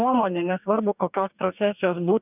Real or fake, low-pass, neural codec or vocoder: fake; 3.6 kHz; codec, 44.1 kHz, 2.6 kbps, DAC